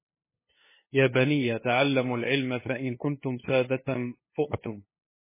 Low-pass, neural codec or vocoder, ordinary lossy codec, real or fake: 3.6 kHz; codec, 16 kHz, 2 kbps, FunCodec, trained on LibriTTS, 25 frames a second; MP3, 16 kbps; fake